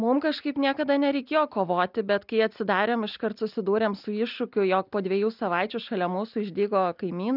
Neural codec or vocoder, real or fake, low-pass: none; real; 5.4 kHz